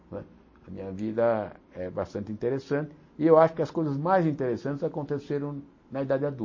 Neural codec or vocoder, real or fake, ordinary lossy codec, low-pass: none; real; MP3, 32 kbps; 7.2 kHz